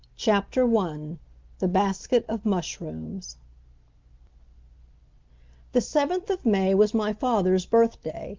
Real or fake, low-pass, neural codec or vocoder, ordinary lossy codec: real; 7.2 kHz; none; Opus, 32 kbps